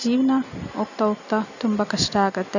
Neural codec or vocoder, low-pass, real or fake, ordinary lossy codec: none; 7.2 kHz; real; none